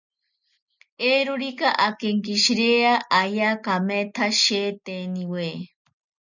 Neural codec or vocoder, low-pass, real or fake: none; 7.2 kHz; real